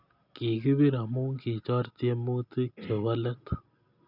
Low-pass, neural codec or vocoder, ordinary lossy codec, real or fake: 5.4 kHz; none; none; real